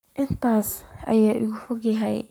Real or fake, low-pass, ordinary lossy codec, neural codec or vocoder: fake; none; none; codec, 44.1 kHz, 7.8 kbps, Pupu-Codec